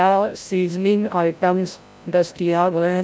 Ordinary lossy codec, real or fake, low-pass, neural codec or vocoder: none; fake; none; codec, 16 kHz, 0.5 kbps, FreqCodec, larger model